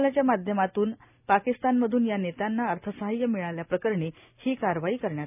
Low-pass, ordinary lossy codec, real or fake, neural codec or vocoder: 3.6 kHz; none; real; none